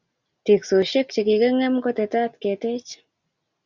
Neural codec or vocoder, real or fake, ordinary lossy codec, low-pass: none; real; Opus, 64 kbps; 7.2 kHz